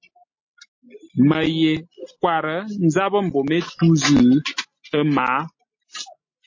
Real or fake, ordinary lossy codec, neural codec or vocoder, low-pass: real; MP3, 32 kbps; none; 7.2 kHz